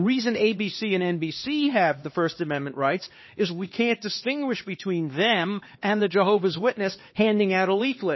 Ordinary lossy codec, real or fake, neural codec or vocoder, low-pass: MP3, 24 kbps; fake; codec, 16 kHz, 2 kbps, X-Codec, HuBERT features, trained on LibriSpeech; 7.2 kHz